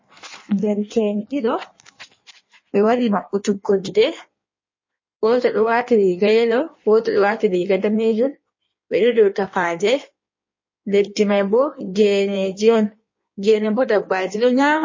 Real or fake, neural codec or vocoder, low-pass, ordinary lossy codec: fake; codec, 16 kHz in and 24 kHz out, 1.1 kbps, FireRedTTS-2 codec; 7.2 kHz; MP3, 32 kbps